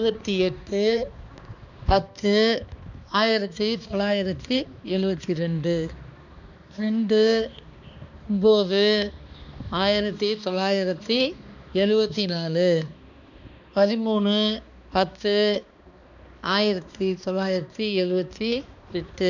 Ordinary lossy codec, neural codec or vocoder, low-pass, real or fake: none; codec, 16 kHz, 2 kbps, X-Codec, HuBERT features, trained on balanced general audio; 7.2 kHz; fake